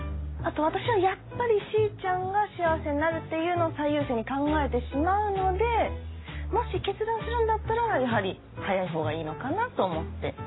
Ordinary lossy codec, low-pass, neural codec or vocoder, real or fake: AAC, 16 kbps; 7.2 kHz; none; real